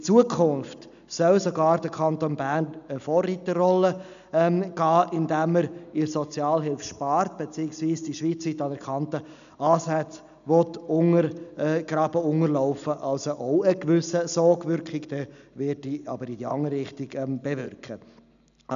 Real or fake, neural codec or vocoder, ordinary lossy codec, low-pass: real; none; none; 7.2 kHz